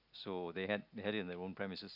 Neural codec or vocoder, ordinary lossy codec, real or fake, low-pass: none; none; real; 5.4 kHz